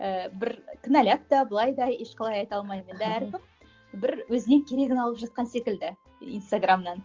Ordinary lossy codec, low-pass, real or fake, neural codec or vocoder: Opus, 32 kbps; 7.2 kHz; real; none